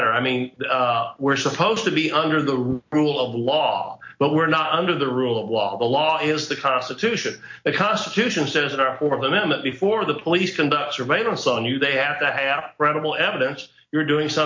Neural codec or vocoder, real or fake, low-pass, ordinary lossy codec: none; real; 7.2 kHz; MP3, 48 kbps